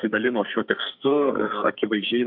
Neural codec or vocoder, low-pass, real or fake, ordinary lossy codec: codec, 44.1 kHz, 2.6 kbps, SNAC; 5.4 kHz; fake; MP3, 48 kbps